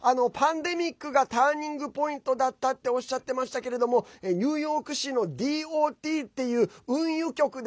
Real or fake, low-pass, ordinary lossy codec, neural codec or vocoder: real; none; none; none